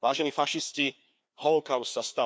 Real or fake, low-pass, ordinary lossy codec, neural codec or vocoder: fake; none; none; codec, 16 kHz, 2 kbps, FreqCodec, larger model